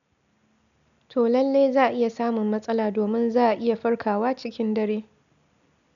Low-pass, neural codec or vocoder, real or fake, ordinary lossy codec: 7.2 kHz; none; real; none